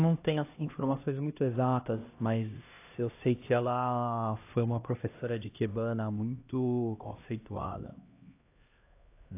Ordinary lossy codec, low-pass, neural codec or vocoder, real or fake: AAC, 24 kbps; 3.6 kHz; codec, 16 kHz, 1 kbps, X-Codec, HuBERT features, trained on LibriSpeech; fake